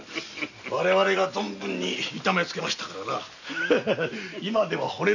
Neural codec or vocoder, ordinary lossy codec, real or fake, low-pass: none; none; real; 7.2 kHz